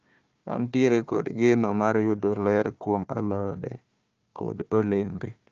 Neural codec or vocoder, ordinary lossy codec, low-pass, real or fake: codec, 16 kHz, 1 kbps, FunCodec, trained on Chinese and English, 50 frames a second; Opus, 24 kbps; 7.2 kHz; fake